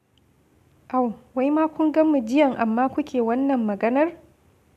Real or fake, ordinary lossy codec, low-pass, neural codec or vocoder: real; none; 14.4 kHz; none